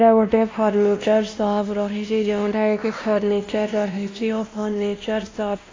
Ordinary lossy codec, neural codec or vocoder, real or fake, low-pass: AAC, 32 kbps; codec, 16 kHz, 1 kbps, X-Codec, WavLM features, trained on Multilingual LibriSpeech; fake; 7.2 kHz